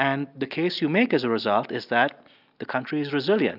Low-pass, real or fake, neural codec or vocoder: 5.4 kHz; real; none